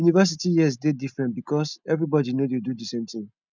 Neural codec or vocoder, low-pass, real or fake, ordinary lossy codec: none; 7.2 kHz; real; none